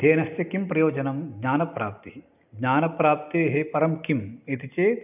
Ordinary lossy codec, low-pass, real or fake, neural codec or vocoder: none; 3.6 kHz; real; none